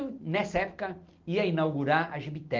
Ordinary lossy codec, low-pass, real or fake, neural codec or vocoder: Opus, 32 kbps; 7.2 kHz; real; none